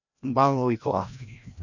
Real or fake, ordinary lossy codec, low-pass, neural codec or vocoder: fake; none; 7.2 kHz; codec, 16 kHz, 1 kbps, FreqCodec, larger model